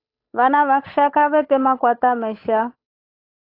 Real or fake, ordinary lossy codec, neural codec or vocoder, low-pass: fake; AAC, 32 kbps; codec, 16 kHz, 8 kbps, FunCodec, trained on Chinese and English, 25 frames a second; 5.4 kHz